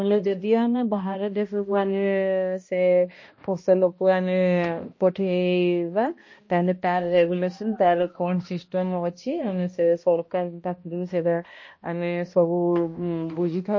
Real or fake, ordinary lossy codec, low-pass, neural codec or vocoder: fake; MP3, 32 kbps; 7.2 kHz; codec, 16 kHz, 1 kbps, X-Codec, HuBERT features, trained on balanced general audio